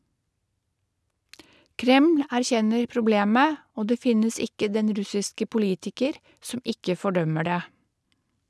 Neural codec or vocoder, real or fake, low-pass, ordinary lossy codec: none; real; none; none